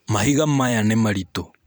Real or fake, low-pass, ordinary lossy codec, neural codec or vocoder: fake; none; none; vocoder, 44.1 kHz, 128 mel bands every 256 samples, BigVGAN v2